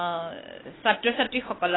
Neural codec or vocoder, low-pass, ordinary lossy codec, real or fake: none; 7.2 kHz; AAC, 16 kbps; real